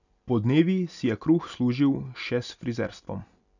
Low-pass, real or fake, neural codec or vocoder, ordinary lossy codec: 7.2 kHz; real; none; none